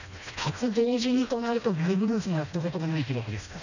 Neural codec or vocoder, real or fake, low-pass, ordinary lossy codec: codec, 16 kHz, 1 kbps, FreqCodec, smaller model; fake; 7.2 kHz; none